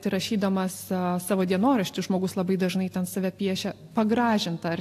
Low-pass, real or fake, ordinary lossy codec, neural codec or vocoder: 14.4 kHz; real; AAC, 64 kbps; none